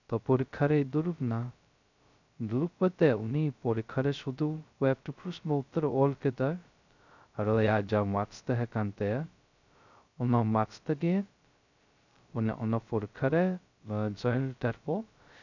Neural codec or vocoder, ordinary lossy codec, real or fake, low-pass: codec, 16 kHz, 0.2 kbps, FocalCodec; none; fake; 7.2 kHz